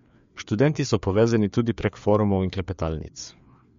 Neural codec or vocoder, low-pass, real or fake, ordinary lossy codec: codec, 16 kHz, 4 kbps, FreqCodec, larger model; 7.2 kHz; fake; MP3, 48 kbps